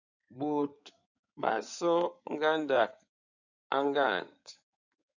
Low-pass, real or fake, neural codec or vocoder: 7.2 kHz; fake; codec, 16 kHz in and 24 kHz out, 2.2 kbps, FireRedTTS-2 codec